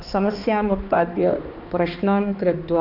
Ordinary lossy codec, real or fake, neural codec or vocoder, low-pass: none; fake; codec, 16 kHz, 2 kbps, X-Codec, HuBERT features, trained on balanced general audio; 5.4 kHz